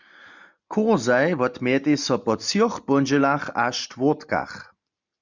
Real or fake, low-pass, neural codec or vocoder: real; 7.2 kHz; none